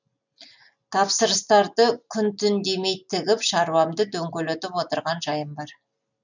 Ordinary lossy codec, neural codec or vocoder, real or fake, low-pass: none; none; real; 7.2 kHz